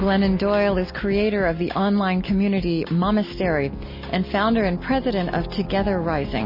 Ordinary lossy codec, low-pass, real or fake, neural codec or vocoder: MP3, 24 kbps; 5.4 kHz; real; none